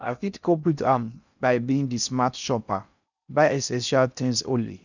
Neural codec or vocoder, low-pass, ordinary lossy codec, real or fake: codec, 16 kHz in and 24 kHz out, 0.6 kbps, FocalCodec, streaming, 4096 codes; 7.2 kHz; none; fake